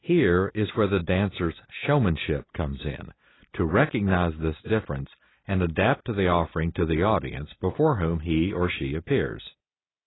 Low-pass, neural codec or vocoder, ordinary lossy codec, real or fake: 7.2 kHz; none; AAC, 16 kbps; real